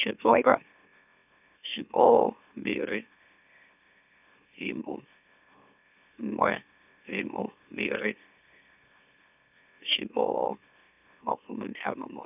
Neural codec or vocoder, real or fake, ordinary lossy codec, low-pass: autoencoder, 44.1 kHz, a latent of 192 numbers a frame, MeloTTS; fake; none; 3.6 kHz